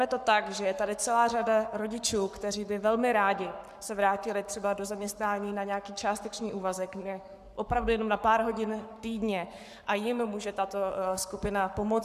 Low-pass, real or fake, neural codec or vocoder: 14.4 kHz; fake; codec, 44.1 kHz, 7.8 kbps, Pupu-Codec